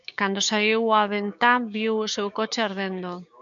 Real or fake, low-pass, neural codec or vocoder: fake; 7.2 kHz; codec, 16 kHz, 6 kbps, DAC